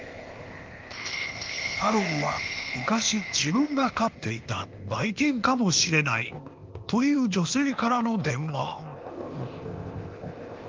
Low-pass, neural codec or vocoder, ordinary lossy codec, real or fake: 7.2 kHz; codec, 16 kHz, 0.8 kbps, ZipCodec; Opus, 32 kbps; fake